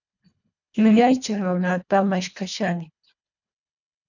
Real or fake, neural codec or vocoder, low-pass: fake; codec, 24 kHz, 1.5 kbps, HILCodec; 7.2 kHz